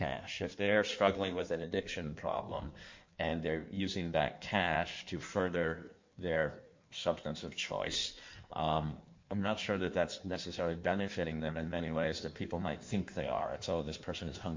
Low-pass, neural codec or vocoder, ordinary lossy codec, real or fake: 7.2 kHz; codec, 16 kHz in and 24 kHz out, 1.1 kbps, FireRedTTS-2 codec; MP3, 48 kbps; fake